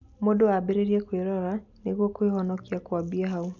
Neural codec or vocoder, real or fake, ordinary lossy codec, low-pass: none; real; none; 7.2 kHz